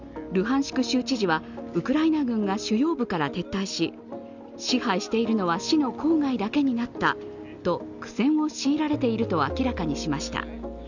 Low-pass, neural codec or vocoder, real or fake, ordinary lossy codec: 7.2 kHz; none; real; none